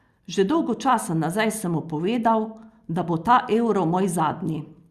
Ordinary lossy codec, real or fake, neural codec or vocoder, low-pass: Opus, 64 kbps; real; none; 14.4 kHz